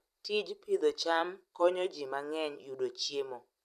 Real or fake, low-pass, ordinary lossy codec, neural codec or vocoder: fake; 14.4 kHz; none; vocoder, 44.1 kHz, 128 mel bands every 256 samples, BigVGAN v2